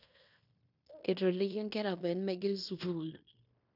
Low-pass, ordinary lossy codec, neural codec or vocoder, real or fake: 5.4 kHz; none; codec, 16 kHz in and 24 kHz out, 0.9 kbps, LongCat-Audio-Codec, fine tuned four codebook decoder; fake